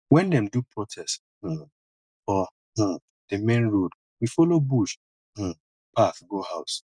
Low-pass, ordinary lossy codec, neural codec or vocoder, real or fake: 9.9 kHz; none; none; real